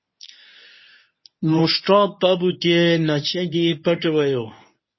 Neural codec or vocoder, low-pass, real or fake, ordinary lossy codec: codec, 24 kHz, 0.9 kbps, WavTokenizer, medium speech release version 1; 7.2 kHz; fake; MP3, 24 kbps